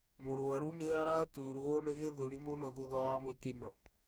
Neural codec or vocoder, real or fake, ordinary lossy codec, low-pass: codec, 44.1 kHz, 2.6 kbps, DAC; fake; none; none